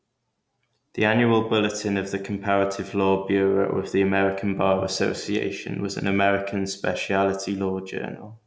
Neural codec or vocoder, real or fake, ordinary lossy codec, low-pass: none; real; none; none